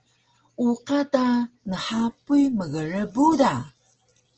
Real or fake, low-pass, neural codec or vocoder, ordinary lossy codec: real; 7.2 kHz; none; Opus, 16 kbps